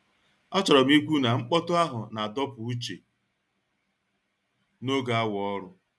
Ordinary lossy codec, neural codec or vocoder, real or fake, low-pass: none; none; real; none